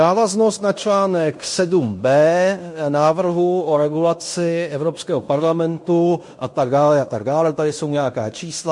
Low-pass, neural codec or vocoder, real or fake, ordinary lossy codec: 10.8 kHz; codec, 16 kHz in and 24 kHz out, 0.9 kbps, LongCat-Audio-Codec, fine tuned four codebook decoder; fake; MP3, 48 kbps